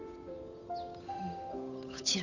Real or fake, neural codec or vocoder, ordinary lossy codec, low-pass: fake; codec, 16 kHz, 8 kbps, FunCodec, trained on Chinese and English, 25 frames a second; none; 7.2 kHz